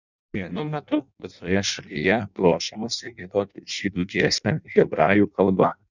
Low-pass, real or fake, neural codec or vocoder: 7.2 kHz; fake; codec, 16 kHz in and 24 kHz out, 0.6 kbps, FireRedTTS-2 codec